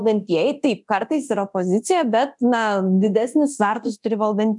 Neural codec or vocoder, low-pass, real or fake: codec, 24 kHz, 0.9 kbps, DualCodec; 10.8 kHz; fake